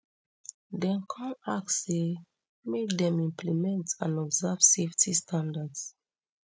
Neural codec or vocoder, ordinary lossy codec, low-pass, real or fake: none; none; none; real